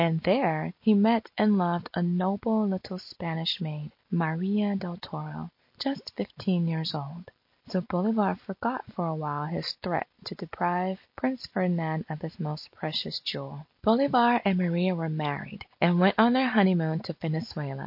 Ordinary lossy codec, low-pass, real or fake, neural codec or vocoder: MP3, 32 kbps; 5.4 kHz; real; none